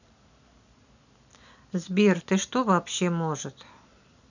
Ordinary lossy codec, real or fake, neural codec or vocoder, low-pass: none; real; none; 7.2 kHz